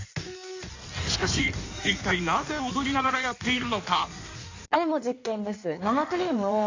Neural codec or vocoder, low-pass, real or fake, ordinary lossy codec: codec, 16 kHz in and 24 kHz out, 1.1 kbps, FireRedTTS-2 codec; 7.2 kHz; fake; AAC, 48 kbps